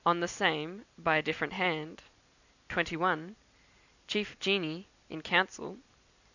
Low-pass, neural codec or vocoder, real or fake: 7.2 kHz; none; real